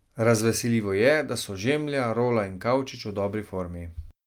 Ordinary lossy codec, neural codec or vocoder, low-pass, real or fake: Opus, 32 kbps; none; 19.8 kHz; real